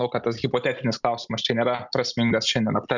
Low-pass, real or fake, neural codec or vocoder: 7.2 kHz; real; none